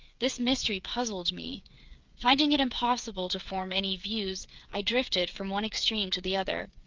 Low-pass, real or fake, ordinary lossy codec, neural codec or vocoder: 7.2 kHz; fake; Opus, 24 kbps; codec, 16 kHz, 8 kbps, FreqCodec, smaller model